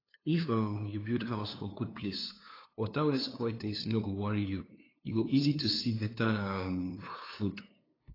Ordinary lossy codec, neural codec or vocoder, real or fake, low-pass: AAC, 24 kbps; codec, 16 kHz, 2 kbps, FunCodec, trained on LibriTTS, 25 frames a second; fake; 5.4 kHz